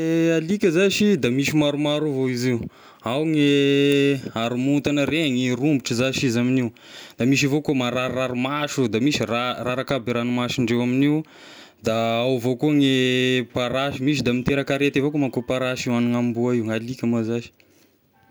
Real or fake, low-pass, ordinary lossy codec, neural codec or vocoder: real; none; none; none